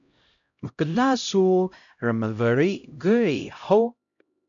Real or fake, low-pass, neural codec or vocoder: fake; 7.2 kHz; codec, 16 kHz, 0.5 kbps, X-Codec, HuBERT features, trained on LibriSpeech